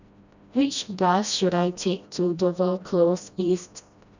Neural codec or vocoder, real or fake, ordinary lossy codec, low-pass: codec, 16 kHz, 1 kbps, FreqCodec, smaller model; fake; none; 7.2 kHz